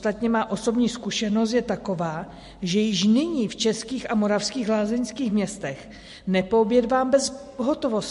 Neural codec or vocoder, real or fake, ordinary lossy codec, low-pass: none; real; MP3, 48 kbps; 14.4 kHz